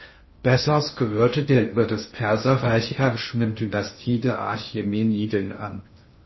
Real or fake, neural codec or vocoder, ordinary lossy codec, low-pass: fake; codec, 16 kHz in and 24 kHz out, 0.6 kbps, FocalCodec, streaming, 2048 codes; MP3, 24 kbps; 7.2 kHz